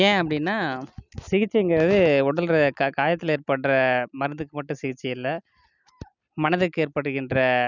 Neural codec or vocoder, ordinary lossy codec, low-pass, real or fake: none; none; 7.2 kHz; real